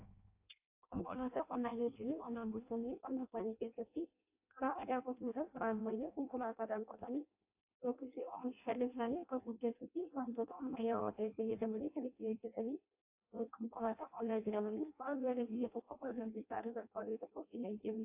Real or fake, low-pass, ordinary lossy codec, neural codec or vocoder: fake; 3.6 kHz; AAC, 32 kbps; codec, 16 kHz in and 24 kHz out, 0.6 kbps, FireRedTTS-2 codec